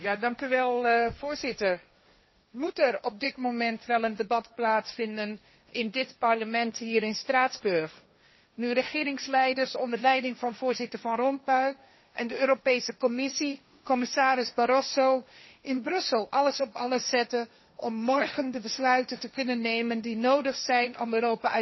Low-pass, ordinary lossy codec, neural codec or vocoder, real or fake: 7.2 kHz; MP3, 24 kbps; codec, 16 kHz, 1.1 kbps, Voila-Tokenizer; fake